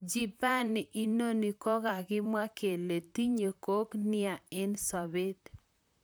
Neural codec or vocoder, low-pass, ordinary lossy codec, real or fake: vocoder, 44.1 kHz, 128 mel bands, Pupu-Vocoder; none; none; fake